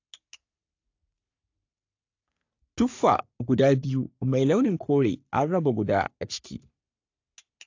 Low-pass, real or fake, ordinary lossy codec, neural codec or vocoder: 7.2 kHz; fake; AAC, 48 kbps; codec, 44.1 kHz, 3.4 kbps, Pupu-Codec